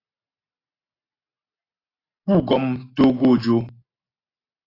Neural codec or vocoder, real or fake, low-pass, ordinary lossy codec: none; real; 5.4 kHz; AAC, 24 kbps